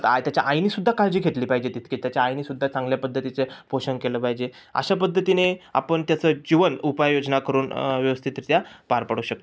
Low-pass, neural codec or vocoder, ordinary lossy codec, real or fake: none; none; none; real